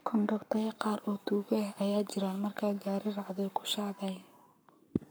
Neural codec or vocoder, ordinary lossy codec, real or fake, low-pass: codec, 44.1 kHz, 7.8 kbps, Pupu-Codec; none; fake; none